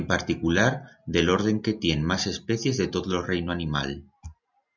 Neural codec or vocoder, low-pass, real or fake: none; 7.2 kHz; real